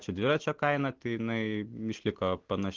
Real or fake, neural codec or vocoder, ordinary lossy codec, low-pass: real; none; Opus, 16 kbps; 7.2 kHz